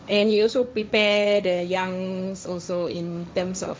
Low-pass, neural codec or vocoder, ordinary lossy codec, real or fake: none; codec, 16 kHz, 1.1 kbps, Voila-Tokenizer; none; fake